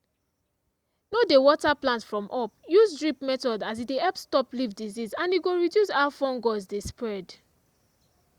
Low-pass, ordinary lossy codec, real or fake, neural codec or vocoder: 19.8 kHz; Opus, 64 kbps; real; none